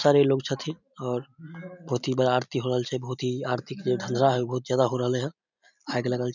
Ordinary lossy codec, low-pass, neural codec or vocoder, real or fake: none; 7.2 kHz; none; real